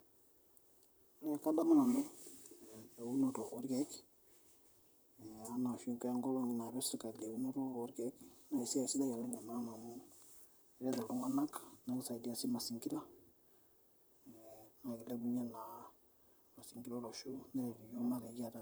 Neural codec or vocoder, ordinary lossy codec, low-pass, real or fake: vocoder, 44.1 kHz, 128 mel bands, Pupu-Vocoder; none; none; fake